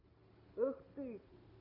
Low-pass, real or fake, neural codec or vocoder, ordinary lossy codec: 5.4 kHz; real; none; AAC, 32 kbps